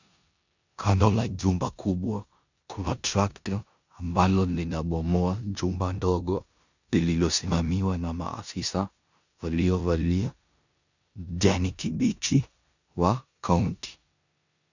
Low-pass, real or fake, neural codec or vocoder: 7.2 kHz; fake; codec, 16 kHz in and 24 kHz out, 0.9 kbps, LongCat-Audio-Codec, four codebook decoder